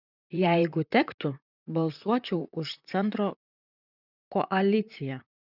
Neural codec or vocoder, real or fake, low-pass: vocoder, 44.1 kHz, 128 mel bands, Pupu-Vocoder; fake; 5.4 kHz